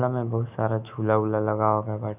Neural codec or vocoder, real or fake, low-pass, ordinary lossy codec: autoencoder, 48 kHz, 128 numbers a frame, DAC-VAE, trained on Japanese speech; fake; 3.6 kHz; none